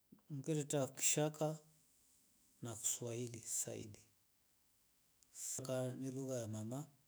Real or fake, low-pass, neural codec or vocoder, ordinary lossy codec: fake; none; autoencoder, 48 kHz, 128 numbers a frame, DAC-VAE, trained on Japanese speech; none